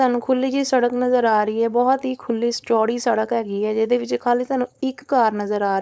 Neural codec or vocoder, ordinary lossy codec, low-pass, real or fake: codec, 16 kHz, 4.8 kbps, FACodec; none; none; fake